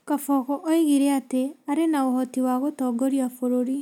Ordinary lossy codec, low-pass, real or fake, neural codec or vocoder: none; 19.8 kHz; real; none